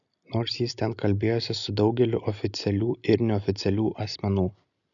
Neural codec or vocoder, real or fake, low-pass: none; real; 7.2 kHz